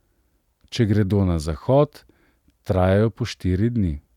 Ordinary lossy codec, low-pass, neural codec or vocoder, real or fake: none; 19.8 kHz; none; real